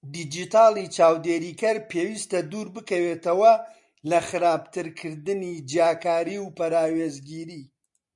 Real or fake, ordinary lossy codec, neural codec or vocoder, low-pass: real; MP3, 64 kbps; none; 10.8 kHz